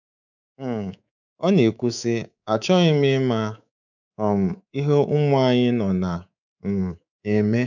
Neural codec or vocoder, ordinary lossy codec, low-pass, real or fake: codec, 24 kHz, 3.1 kbps, DualCodec; none; 7.2 kHz; fake